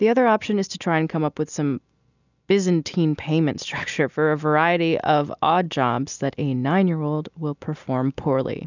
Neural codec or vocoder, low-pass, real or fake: none; 7.2 kHz; real